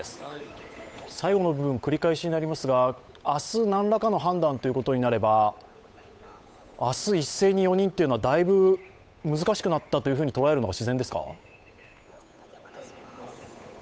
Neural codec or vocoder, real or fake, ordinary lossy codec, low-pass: codec, 16 kHz, 8 kbps, FunCodec, trained on Chinese and English, 25 frames a second; fake; none; none